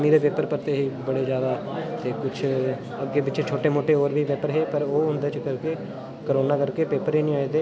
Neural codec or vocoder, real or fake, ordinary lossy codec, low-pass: none; real; none; none